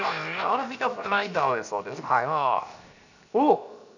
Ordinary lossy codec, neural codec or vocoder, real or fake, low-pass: none; codec, 16 kHz, 0.7 kbps, FocalCodec; fake; 7.2 kHz